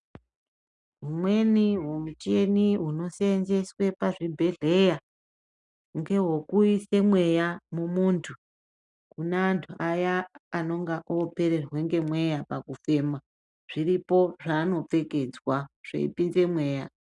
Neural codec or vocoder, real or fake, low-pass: none; real; 10.8 kHz